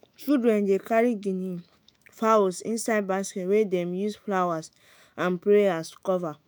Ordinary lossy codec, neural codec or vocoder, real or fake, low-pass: none; autoencoder, 48 kHz, 128 numbers a frame, DAC-VAE, trained on Japanese speech; fake; none